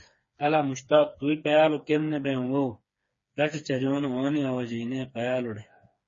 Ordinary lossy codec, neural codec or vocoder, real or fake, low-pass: MP3, 32 kbps; codec, 16 kHz, 4 kbps, FreqCodec, smaller model; fake; 7.2 kHz